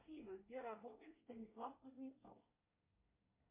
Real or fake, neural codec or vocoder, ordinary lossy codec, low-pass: fake; codec, 24 kHz, 1 kbps, SNAC; MP3, 32 kbps; 3.6 kHz